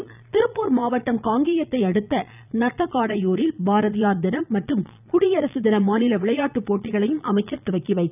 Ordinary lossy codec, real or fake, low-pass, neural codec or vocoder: none; fake; 3.6 kHz; vocoder, 22.05 kHz, 80 mel bands, Vocos